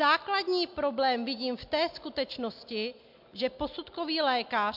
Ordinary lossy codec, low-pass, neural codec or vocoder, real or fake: MP3, 48 kbps; 5.4 kHz; none; real